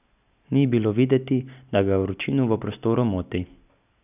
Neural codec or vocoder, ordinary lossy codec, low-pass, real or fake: none; none; 3.6 kHz; real